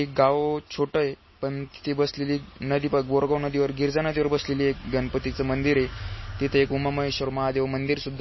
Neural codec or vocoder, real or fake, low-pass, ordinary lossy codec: none; real; 7.2 kHz; MP3, 24 kbps